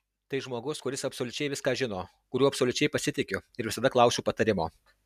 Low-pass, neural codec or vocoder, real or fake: 14.4 kHz; none; real